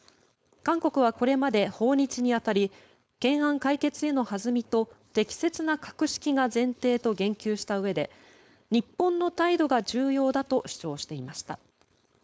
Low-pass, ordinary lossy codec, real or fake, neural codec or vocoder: none; none; fake; codec, 16 kHz, 4.8 kbps, FACodec